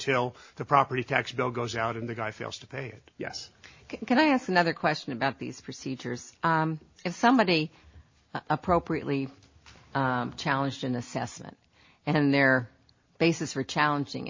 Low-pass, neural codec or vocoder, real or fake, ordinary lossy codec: 7.2 kHz; none; real; MP3, 32 kbps